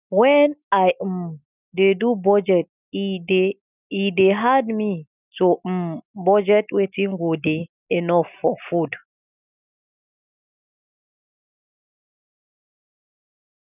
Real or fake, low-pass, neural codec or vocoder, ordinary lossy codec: real; 3.6 kHz; none; none